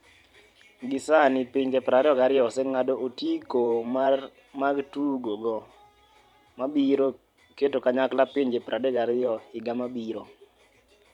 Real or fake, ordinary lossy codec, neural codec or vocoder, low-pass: fake; none; vocoder, 44.1 kHz, 128 mel bands every 512 samples, BigVGAN v2; 19.8 kHz